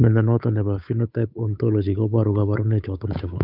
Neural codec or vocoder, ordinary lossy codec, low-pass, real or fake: codec, 16 kHz, 8 kbps, FunCodec, trained on Chinese and English, 25 frames a second; none; 5.4 kHz; fake